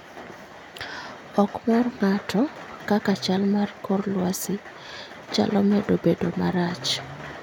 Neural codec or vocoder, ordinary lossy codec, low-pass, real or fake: none; none; 19.8 kHz; real